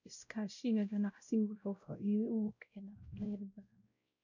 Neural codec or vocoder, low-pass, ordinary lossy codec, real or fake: codec, 16 kHz, 0.5 kbps, X-Codec, WavLM features, trained on Multilingual LibriSpeech; 7.2 kHz; none; fake